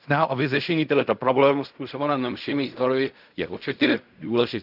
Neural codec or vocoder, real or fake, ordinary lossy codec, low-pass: codec, 16 kHz in and 24 kHz out, 0.4 kbps, LongCat-Audio-Codec, fine tuned four codebook decoder; fake; none; 5.4 kHz